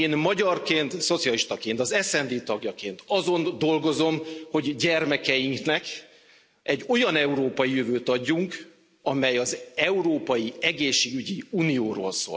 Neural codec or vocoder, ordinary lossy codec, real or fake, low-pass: none; none; real; none